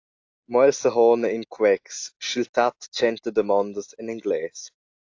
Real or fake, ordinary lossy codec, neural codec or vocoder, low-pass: real; AAC, 48 kbps; none; 7.2 kHz